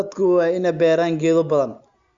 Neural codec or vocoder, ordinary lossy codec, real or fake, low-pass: none; Opus, 64 kbps; real; 7.2 kHz